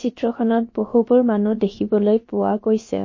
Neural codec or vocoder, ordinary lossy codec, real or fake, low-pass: codec, 16 kHz, about 1 kbps, DyCAST, with the encoder's durations; MP3, 32 kbps; fake; 7.2 kHz